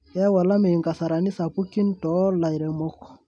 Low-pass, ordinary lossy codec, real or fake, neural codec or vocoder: 9.9 kHz; none; real; none